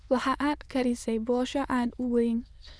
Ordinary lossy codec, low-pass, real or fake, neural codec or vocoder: none; none; fake; autoencoder, 22.05 kHz, a latent of 192 numbers a frame, VITS, trained on many speakers